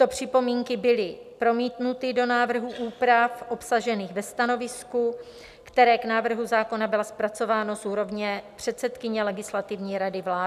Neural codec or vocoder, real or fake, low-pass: none; real; 14.4 kHz